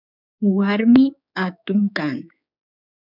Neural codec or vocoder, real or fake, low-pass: codec, 16 kHz, 4 kbps, X-Codec, HuBERT features, trained on general audio; fake; 5.4 kHz